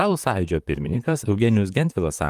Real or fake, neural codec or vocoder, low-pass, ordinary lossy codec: fake; vocoder, 44.1 kHz, 128 mel bands, Pupu-Vocoder; 14.4 kHz; Opus, 32 kbps